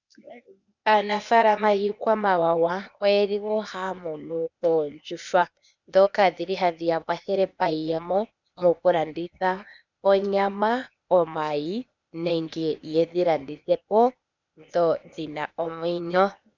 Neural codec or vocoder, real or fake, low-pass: codec, 16 kHz, 0.8 kbps, ZipCodec; fake; 7.2 kHz